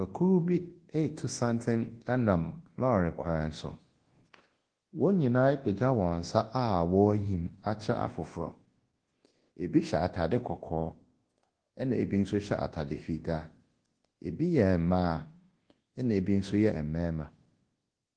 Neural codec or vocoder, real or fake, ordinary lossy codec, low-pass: codec, 24 kHz, 0.9 kbps, WavTokenizer, large speech release; fake; Opus, 16 kbps; 9.9 kHz